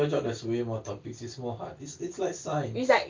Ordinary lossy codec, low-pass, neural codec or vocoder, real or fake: Opus, 32 kbps; 7.2 kHz; none; real